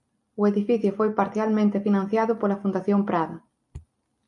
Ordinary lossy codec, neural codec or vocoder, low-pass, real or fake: MP3, 48 kbps; none; 10.8 kHz; real